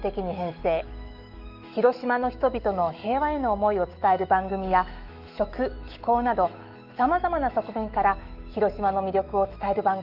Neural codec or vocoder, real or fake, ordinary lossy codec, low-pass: autoencoder, 48 kHz, 128 numbers a frame, DAC-VAE, trained on Japanese speech; fake; Opus, 32 kbps; 5.4 kHz